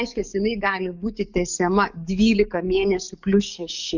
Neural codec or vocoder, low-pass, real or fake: codec, 44.1 kHz, 7.8 kbps, DAC; 7.2 kHz; fake